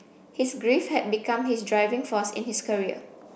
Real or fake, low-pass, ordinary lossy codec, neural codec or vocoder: real; none; none; none